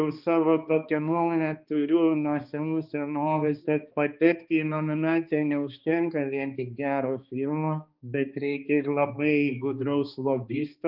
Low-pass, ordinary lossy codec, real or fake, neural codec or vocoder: 5.4 kHz; Opus, 24 kbps; fake; codec, 16 kHz, 2 kbps, X-Codec, HuBERT features, trained on balanced general audio